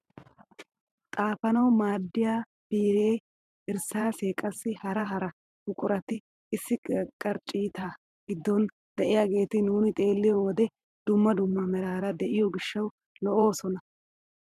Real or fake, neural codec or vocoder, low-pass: fake; vocoder, 44.1 kHz, 128 mel bands every 512 samples, BigVGAN v2; 14.4 kHz